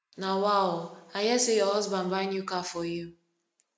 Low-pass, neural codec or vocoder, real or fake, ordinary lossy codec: none; none; real; none